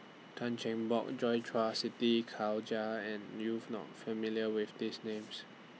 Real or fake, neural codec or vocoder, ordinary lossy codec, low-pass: real; none; none; none